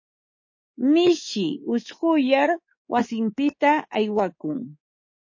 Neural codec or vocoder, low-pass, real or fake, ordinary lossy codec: codec, 24 kHz, 3.1 kbps, DualCodec; 7.2 kHz; fake; MP3, 32 kbps